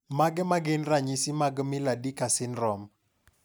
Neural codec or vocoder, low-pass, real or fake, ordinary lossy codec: none; none; real; none